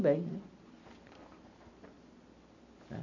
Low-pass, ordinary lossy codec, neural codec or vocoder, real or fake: 7.2 kHz; none; none; real